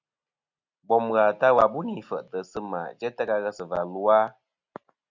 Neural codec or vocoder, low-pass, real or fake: none; 7.2 kHz; real